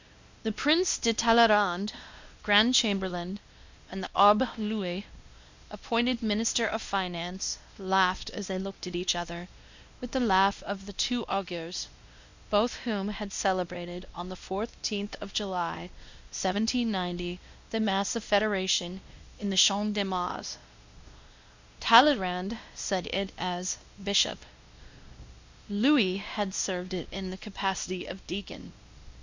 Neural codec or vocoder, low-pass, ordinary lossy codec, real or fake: codec, 16 kHz, 1 kbps, X-Codec, WavLM features, trained on Multilingual LibriSpeech; 7.2 kHz; Opus, 64 kbps; fake